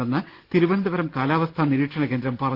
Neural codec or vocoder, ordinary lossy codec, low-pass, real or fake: none; Opus, 16 kbps; 5.4 kHz; real